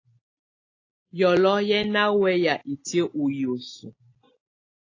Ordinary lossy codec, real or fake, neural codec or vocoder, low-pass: AAC, 32 kbps; real; none; 7.2 kHz